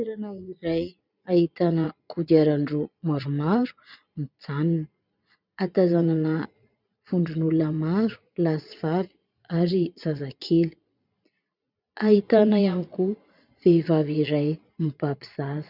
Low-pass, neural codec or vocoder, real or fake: 5.4 kHz; vocoder, 22.05 kHz, 80 mel bands, Vocos; fake